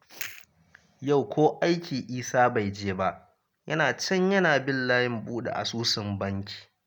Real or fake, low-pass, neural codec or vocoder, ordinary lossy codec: real; none; none; none